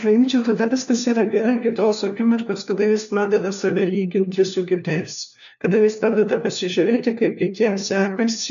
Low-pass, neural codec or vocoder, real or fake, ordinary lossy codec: 7.2 kHz; codec, 16 kHz, 1 kbps, FunCodec, trained on LibriTTS, 50 frames a second; fake; AAC, 96 kbps